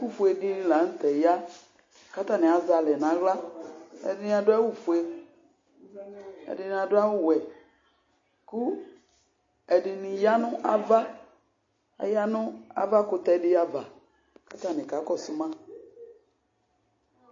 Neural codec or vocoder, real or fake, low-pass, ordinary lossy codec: none; real; 7.2 kHz; MP3, 32 kbps